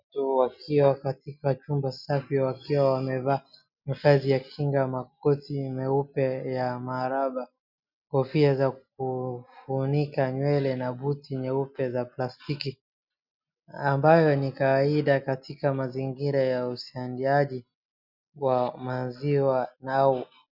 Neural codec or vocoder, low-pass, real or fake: none; 5.4 kHz; real